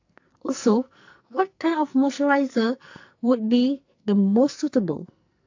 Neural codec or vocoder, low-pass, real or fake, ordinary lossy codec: codec, 44.1 kHz, 2.6 kbps, SNAC; 7.2 kHz; fake; AAC, 48 kbps